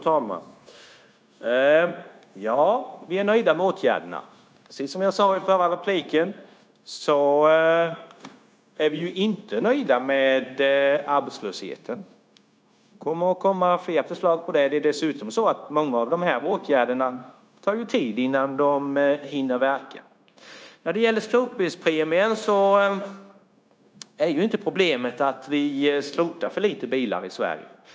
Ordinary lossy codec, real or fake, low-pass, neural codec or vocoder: none; fake; none; codec, 16 kHz, 0.9 kbps, LongCat-Audio-Codec